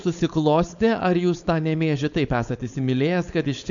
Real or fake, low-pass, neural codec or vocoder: fake; 7.2 kHz; codec, 16 kHz, 4.8 kbps, FACodec